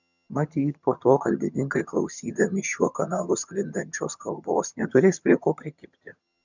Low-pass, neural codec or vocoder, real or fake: 7.2 kHz; vocoder, 22.05 kHz, 80 mel bands, HiFi-GAN; fake